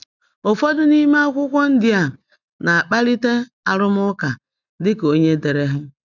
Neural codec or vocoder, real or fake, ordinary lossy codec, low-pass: none; real; none; 7.2 kHz